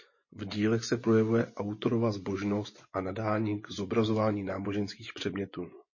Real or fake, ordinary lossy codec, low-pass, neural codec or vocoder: fake; MP3, 32 kbps; 7.2 kHz; vocoder, 24 kHz, 100 mel bands, Vocos